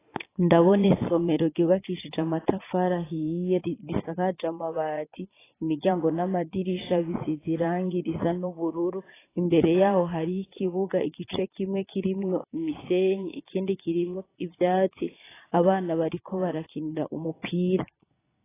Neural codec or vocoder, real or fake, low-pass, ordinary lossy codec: vocoder, 22.05 kHz, 80 mel bands, Vocos; fake; 3.6 kHz; AAC, 16 kbps